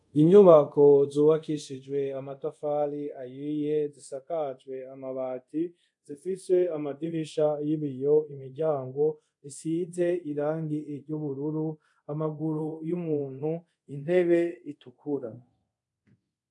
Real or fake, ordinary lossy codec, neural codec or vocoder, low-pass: fake; AAC, 64 kbps; codec, 24 kHz, 0.5 kbps, DualCodec; 10.8 kHz